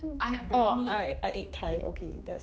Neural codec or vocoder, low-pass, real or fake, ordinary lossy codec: codec, 16 kHz, 2 kbps, X-Codec, HuBERT features, trained on general audio; none; fake; none